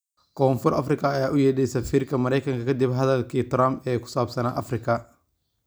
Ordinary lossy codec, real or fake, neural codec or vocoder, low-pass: none; real; none; none